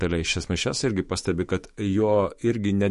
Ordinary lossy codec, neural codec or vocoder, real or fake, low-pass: MP3, 48 kbps; autoencoder, 48 kHz, 128 numbers a frame, DAC-VAE, trained on Japanese speech; fake; 14.4 kHz